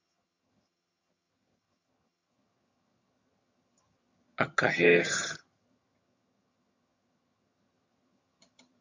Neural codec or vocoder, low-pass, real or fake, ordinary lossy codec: vocoder, 22.05 kHz, 80 mel bands, HiFi-GAN; 7.2 kHz; fake; MP3, 48 kbps